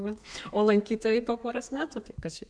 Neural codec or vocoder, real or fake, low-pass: codec, 32 kHz, 1.9 kbps, SNAC; fake; 9.9 kHz